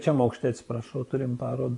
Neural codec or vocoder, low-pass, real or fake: vocoder, 24 kHz, 100 mel bands, Vocos; 10.8 kHz; fake